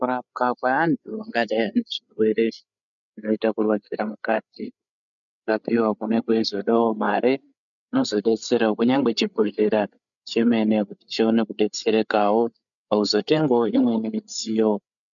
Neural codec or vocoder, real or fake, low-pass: codec, 16 kHz, 8 kbps, FreqCodec, larger model; fake; 7.2 kHz